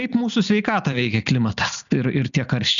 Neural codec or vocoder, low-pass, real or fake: none; 7.2 kHz; real